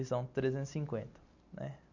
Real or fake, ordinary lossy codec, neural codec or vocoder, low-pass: real; none; none; 7.2 kHz